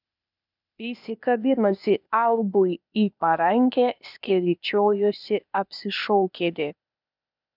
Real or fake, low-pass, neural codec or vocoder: fake; 5.4 kHz; codec, 16 kHz, 0.8 kbps, ZipCodec